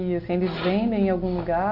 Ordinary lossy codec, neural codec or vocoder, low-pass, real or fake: none; none; 5.4 kHz; real